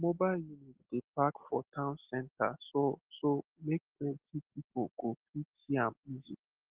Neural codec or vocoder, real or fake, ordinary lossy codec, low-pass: none; real; Opus, 16 kbps; 3.6 kHz